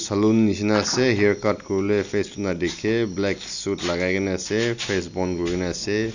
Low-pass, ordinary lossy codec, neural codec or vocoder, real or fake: 7.2 kHz; none; none; real